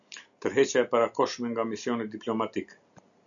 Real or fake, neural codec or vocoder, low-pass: real; none; 7.2 kHz